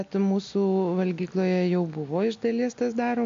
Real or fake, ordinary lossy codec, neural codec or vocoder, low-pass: real; AAC, 48 kbps; none; 7.2 kHz